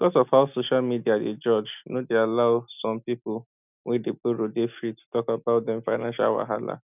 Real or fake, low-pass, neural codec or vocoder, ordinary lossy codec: real; 3.6 kHz; none; none